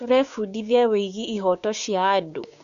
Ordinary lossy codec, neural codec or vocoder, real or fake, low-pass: AAC, 96 kbps; codec, 16 kHz, 6 kbps, DAC; fake; 7.2 kHz